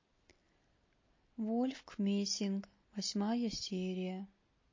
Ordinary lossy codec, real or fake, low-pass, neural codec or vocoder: MP3, 32 kbps; real; 7.2 kHz; none